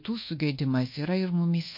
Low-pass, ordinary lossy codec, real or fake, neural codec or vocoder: 5.4 kHz; MP3, 32 kbps; fake; codec, 24 kHz, 1.2 kbps, DualCodec